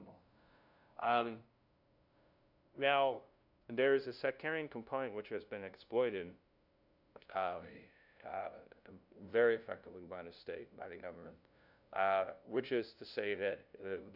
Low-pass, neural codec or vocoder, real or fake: 5.4 kHz; codec, 16 kHz, 0.5 kbps, FunCodec, trained on LibriTTS, 25 frames a second; fake